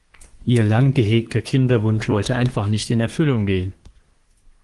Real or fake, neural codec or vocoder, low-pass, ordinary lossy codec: fake; codec, 24 kHz, 1 kbps, SNAC; 10.8 kHz; Opus, 24 kbps